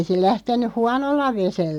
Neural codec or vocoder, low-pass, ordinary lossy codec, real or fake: none; 19.8 kHz; none; real